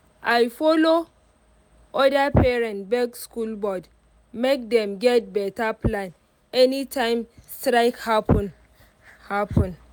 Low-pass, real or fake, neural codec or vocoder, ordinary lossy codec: none; real; none; none